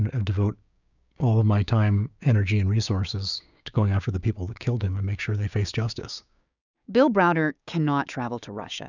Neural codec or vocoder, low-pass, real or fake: codec, 16 kHz, 6 kbps, DAC; 7.2 kHz; fake